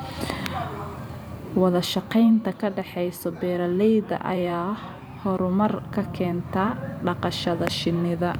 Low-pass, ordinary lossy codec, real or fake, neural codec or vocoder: none; none; fake; vocoder, 44.1 kHz, 128 mel bands every 512 samples, BigVGAN v2